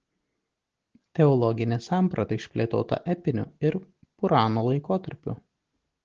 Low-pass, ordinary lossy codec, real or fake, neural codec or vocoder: 7.2 kHz; Opus, 16 kbps; real; none